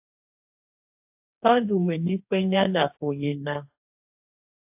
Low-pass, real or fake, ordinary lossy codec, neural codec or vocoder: 3.6 kHz; fake; AAC, 32 kbps; codec, 44.1 kHz, 2.6 kbps, DAC